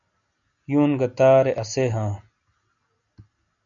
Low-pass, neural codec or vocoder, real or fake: 7.2 kHz; none; real